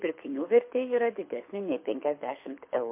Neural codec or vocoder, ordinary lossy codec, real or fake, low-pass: codec, 24 kHz, 6 kbps, HILCodec; MP3, 32 kbps; fake; 3.6 kHz